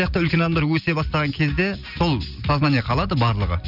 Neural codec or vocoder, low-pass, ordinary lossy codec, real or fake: none; 5.4 kHz; none; real